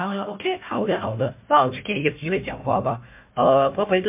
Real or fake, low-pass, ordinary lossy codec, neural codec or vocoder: fake; 3.6 kHz; MP3, 32 kbps; codec, 16 kHz, 1 kbps, FunCodec, trained on Chinese and English, 50 frames a second